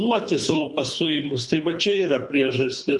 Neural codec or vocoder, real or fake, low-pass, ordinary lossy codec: codec, 24 kHz, 3 kbps, HILCodec; fake; 10.8 kHz; Opus, 64 kbps